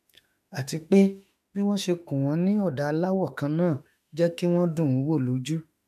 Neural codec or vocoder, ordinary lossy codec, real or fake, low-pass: autoencoder, 48 kHz, 32 numbers a frame, DAC-VAE, trained on Japanese speech; AAC, 96 kbps; fake; 14.4 kHz